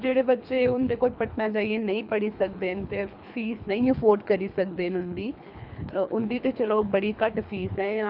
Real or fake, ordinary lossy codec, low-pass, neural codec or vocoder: fake; none; 5.4 kHz; codec, 24 kHz, 3 kbps, HILCodec